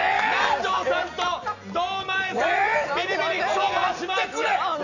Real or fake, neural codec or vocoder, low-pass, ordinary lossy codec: real; none; 7.2 kHz; none